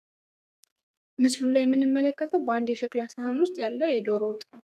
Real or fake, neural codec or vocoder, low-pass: fake; codec, 32 kHz, 1.9 kbps, SNAC; 14.4 kHz